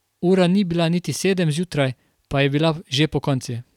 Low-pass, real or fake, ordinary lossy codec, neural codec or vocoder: 19.8 kHz; fake; none; vocoder, 44.1 kHz, 128 mel bands every 256 samples, BigVGAN v2